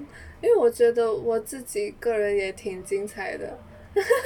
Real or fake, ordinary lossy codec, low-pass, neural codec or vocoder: real; none; 19.8 kHz; none